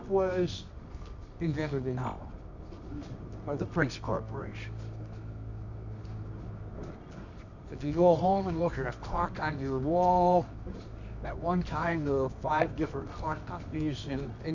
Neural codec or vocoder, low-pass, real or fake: codec, 24 kHz, 0.9 kbps, WavTokenizer, medium music audio release; 7.2 kHz; fake